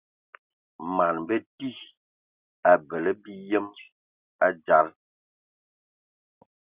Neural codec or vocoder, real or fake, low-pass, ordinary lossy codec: none; real; 3.6 kHz; Opus, 64 kbps